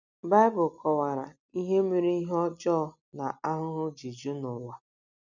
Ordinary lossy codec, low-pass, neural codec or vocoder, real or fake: none; 7.2 kHz; none; real